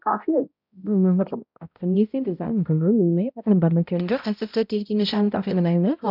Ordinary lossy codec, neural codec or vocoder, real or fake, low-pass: none; codec, 16 kHz, 0.5 kbps, X-Codec, HuBERT features, trained on balanced general audio; fake; 5.4 kHz